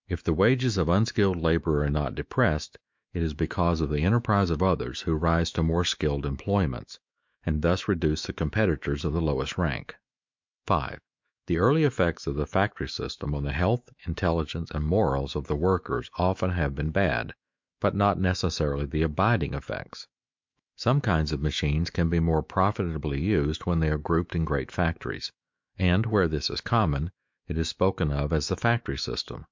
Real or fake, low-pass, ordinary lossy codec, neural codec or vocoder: fake; 7.2 kHz; MP3, 64 kbps; autoencoder, 48 kHz, 128 numbers a frame, DAC-VAE, trained on Japanese speech